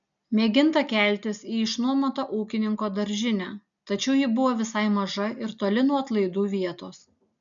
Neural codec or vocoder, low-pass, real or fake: none; 7.2 kHz; real